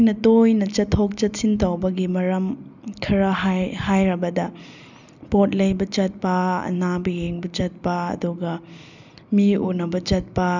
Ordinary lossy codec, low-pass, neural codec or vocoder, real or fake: none; 7.2 kHz; none; real